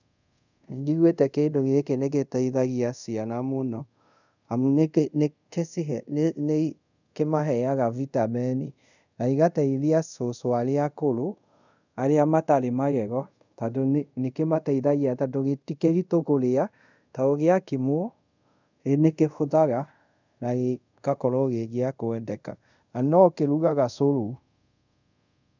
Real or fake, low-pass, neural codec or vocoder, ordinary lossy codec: fake; 7.2 kHz; codec, 24 kHz, 0.5 kbps, DualCodec; none